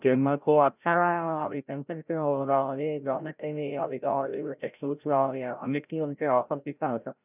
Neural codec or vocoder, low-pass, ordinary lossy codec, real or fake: codec, 16 kHz, 0.5 kbps, FreqCodec, larger model; 3.6 kHz; none; fake